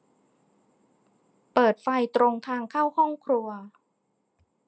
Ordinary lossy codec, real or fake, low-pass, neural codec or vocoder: none; real; none; none